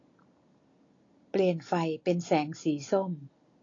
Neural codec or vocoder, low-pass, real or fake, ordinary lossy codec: none; 7.2 kHz; real; AAC, 32 kbps